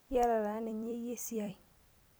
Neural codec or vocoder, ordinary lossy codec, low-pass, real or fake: vocoder, 44.1 kHz, 128 mel bands every 256 samples, BigVGAN v2; none; none; fake